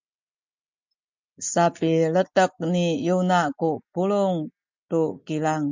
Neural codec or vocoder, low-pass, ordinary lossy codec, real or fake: none; 7.2 kHz; MP3, 64 kbps; real